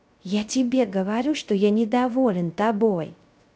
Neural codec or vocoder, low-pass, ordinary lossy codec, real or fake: codec, 16 kHz, 0.3 kbps, FocalCodec; none; none; fake